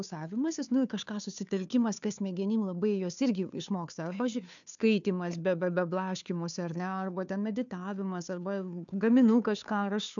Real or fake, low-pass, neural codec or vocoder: fake; 7.2 kHz; codec, 16 kHz, 2 kbps, FunCodec, trained on Chinese and English, 25 frames a second